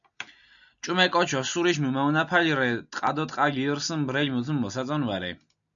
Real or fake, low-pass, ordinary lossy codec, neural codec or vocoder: real; 7.2 kHz; MP3, 96 kbps; none